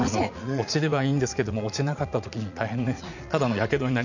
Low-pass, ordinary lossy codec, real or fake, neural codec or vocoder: 7.2 kHz; none; fake; vocoder, 44.1 kHz, 80 mel bands, Vocos